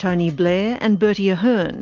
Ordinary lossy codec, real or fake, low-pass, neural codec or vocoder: Opus, 24 kbps; real; 7.2 kHz; none